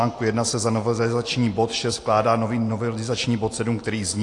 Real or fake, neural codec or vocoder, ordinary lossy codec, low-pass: real; none; AAC, 48 kbps; 10.8 kHz